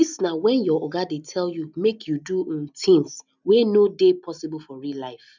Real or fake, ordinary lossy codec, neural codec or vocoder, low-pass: real; none; none; 7.2 kHz